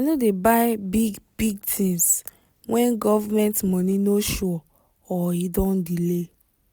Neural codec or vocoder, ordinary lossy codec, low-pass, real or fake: none; none; none; real